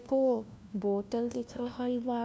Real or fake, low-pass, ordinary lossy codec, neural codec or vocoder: fake; none; none; codec, 16 kHz, 1 kbps, FunCodec, trained on LibriTTS, 50 frames a second